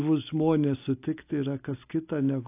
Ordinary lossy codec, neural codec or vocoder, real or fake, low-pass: AAC, 24 kbps; none; real; 3.6 kHz